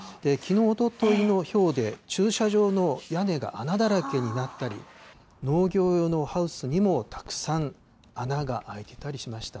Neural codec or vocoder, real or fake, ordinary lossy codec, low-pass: none; real; none; none